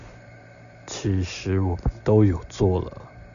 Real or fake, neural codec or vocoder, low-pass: real; none; 7.2 kHz